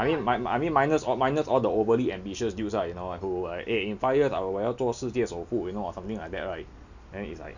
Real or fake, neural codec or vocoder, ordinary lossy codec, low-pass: real; none; none; 7.2 kHz